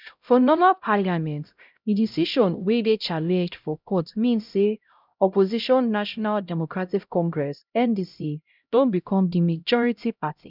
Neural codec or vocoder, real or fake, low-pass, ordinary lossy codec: codec, 16 kHz, 0.5 kbps, X-Codec, HuBERT features, trained on LibriSpeech; fake; 5.4 kHz; none